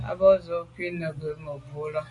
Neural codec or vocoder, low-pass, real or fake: none; 10.8 kHz; real